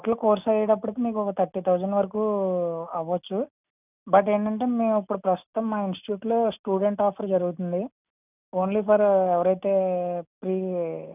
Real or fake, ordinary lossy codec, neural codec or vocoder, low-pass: real; none; none; 3.6 kHz